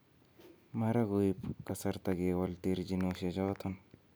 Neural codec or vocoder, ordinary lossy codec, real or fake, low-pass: none; none; real; none